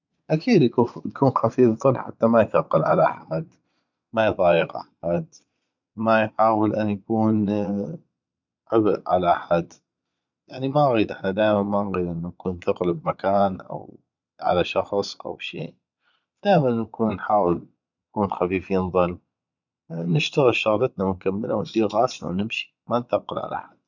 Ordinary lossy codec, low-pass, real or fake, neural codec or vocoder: none; 7.2 kHz; fake; vocoder, 22.05 kHz, 80 mel bands, Vocos